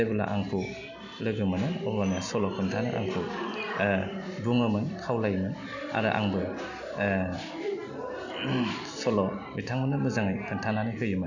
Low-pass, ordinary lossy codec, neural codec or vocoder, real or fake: 7.2 kHz; none; none; real